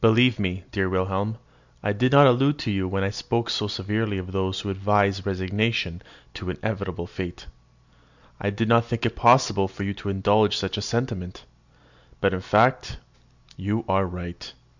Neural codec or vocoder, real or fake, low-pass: none; real; 7.2 kHz